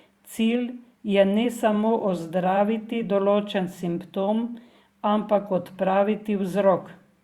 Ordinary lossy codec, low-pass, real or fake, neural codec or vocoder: Opus, 64 kbps; 19.8 kHz; fake; vocoder, 44.1 kHz, 128 mel bands every 512 samples, BigVGAN v2